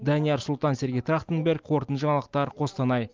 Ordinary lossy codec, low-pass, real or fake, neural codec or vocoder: Opus, 24 kbps; 7.2 kHz; real; none